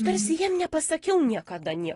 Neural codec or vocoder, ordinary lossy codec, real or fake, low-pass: none; AAC, 32 kbps; real; 10.8 kHz